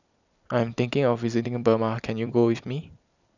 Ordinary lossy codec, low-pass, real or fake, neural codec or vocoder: none; 7.2 kHz; fake; vocoder, 44.1 kHz, 128 mel bands every 256 samples, BigVGAN v2